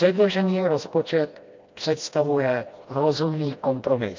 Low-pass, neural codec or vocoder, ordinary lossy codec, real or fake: 7.2 kHz; codec, 16 kHz, 1 kbps, FreqCodec, smaller model; MP3, 48 kbps; fake